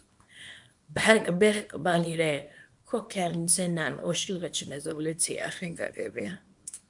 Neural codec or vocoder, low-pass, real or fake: codec, 24 kHz, 0.9 kbps, WavTokenizer, small release; 10.8 kHz; fake